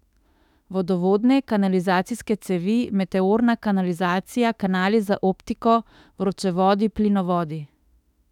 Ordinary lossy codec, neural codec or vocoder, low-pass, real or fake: none; autoencoder, 48 kHz, 32 numbers a frame, DAC-VAE, trained on Japanese speech; 19.8 kHz; fake